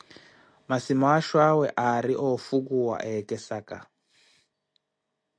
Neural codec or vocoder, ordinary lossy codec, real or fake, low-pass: none; MP3, 64 kbps; real; 9.9 kHz